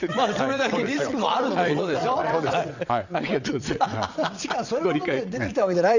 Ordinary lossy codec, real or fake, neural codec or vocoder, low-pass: Opus, 64 kbps; fake; vocoder, 22.05 kHz, 80 mel bands, WaveNeXt; 7.2 kHz